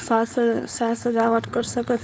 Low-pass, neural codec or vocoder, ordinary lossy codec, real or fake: none; codec, 16 kHz, 4.8 kbps, FACodec; none; fake